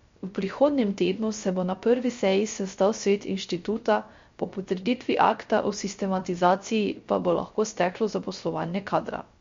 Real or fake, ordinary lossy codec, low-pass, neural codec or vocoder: fake; MP3, 48 kbps; 7.2 kHz; codec, 16 kHz, 0.3 kbps, FocalCodec